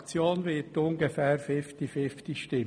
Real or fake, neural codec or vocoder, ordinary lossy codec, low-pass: real; none; none; 9.9 kHz